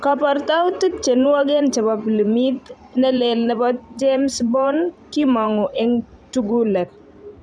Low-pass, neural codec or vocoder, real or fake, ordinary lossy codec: 9.9 kHz; vocoder, 44.1 kHz, 128 mel bands every 512 samples, BigVGAN v2; fake; none